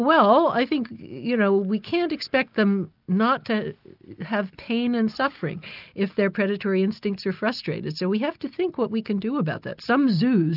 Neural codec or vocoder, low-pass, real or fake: none; 5.4 kHz; real